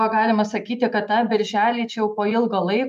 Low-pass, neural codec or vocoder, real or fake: 14.4 kHz; none; real